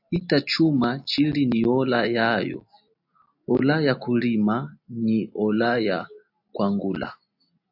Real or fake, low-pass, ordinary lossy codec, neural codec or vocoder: real; 5.4 kHz; AAC, 48 kbps; none